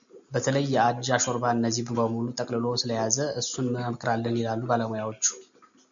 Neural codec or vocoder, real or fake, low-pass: none; real; 7.2 kHz